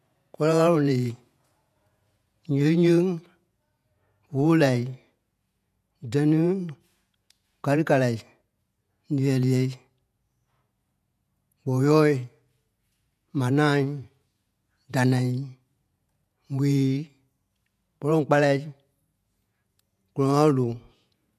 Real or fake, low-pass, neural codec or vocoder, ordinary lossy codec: fake; 14.4 kHz; vocoder, 48 kHz, 128 mel bands, Vocos; MP3, 96 kbps